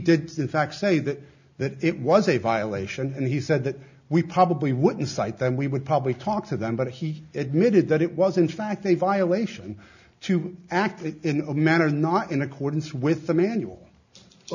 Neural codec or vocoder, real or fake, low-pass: none; real; 7.2 kHz